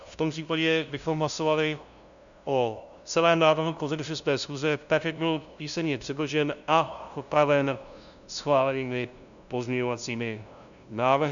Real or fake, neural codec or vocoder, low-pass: fake; codec, 16 kHz, 0.5 kbps, FunCodec, trained on LibriTTS, 25 frames a second; 7.2 kHz